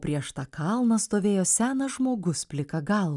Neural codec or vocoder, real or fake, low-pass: none; real; 10.8 kHz